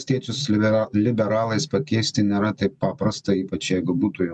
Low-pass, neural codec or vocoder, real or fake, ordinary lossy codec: 10.8 kHz; none; real; MP3, 96 kbps